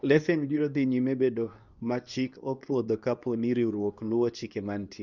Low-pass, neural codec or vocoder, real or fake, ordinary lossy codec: 7.2 kHz; codec, 24 kHz, 0.9 kbps, WavTokenizer, medium speech release version 2; fake; none